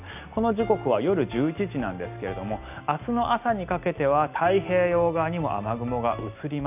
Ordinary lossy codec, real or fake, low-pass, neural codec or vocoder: none; real; 3.6 kHz; none